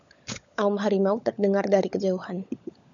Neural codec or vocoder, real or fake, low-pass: codec, 16 kHz, 16 kbps, FunCodec, trained on LibriTTS, 50 frames a second; fake; 7.2 kHz